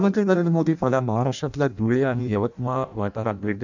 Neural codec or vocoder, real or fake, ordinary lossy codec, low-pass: codec, 16 kHz in and 24 kHz out, 0.6 kbps, FireRedTTS-2 codec; fake; none; 7.2 kHz